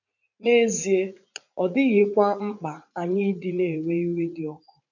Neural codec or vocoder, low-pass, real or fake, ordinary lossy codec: vocoder, 44.1 kHz, 80 mel bands, Vocos; 7.2 kHz; fake; none